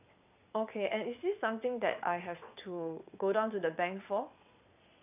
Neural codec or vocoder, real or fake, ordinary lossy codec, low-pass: vocoder, 22.05 kHz, 80 mel bands, WaveNeXt; fake; none; 3.6 kHz